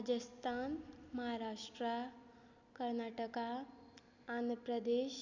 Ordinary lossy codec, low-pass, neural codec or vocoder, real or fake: none; 7.2 kHz; none; real